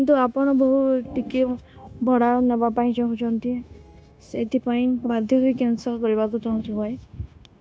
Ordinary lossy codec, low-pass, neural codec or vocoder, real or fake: none; none; codec, 16 kHz, 0.9 kbps, LongCat-Audio-Codec; fake